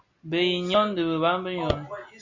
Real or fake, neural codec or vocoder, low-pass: real; none; 7.2 kHz